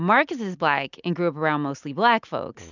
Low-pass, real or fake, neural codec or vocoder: 7.2 kHz; real; none